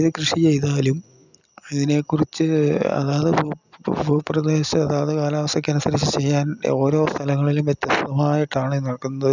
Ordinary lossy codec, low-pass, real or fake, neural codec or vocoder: none; 7.2 kHz; real; none